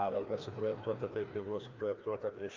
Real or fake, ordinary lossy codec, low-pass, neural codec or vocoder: fake; Opus, 24 kbps; 7.2 kHz; codec, 16 kHz, 2 kbps, FreqCodec, larger model